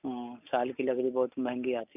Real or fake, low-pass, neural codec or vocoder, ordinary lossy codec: real; 3.6 kHz; none; none